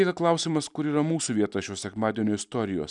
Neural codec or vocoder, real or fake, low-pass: none; real; 10.8 kHz